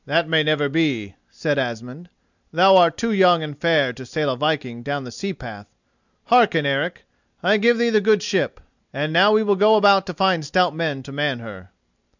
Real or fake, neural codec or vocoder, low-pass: real; none; 7.2 kHz